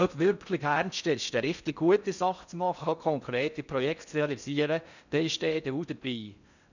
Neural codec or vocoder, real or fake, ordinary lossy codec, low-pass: codec, 16 kHz in and 24 kHz out, 0.6 kbps, FocalCodec, streaming, 4096 codes; fake; none; 7.2 kHz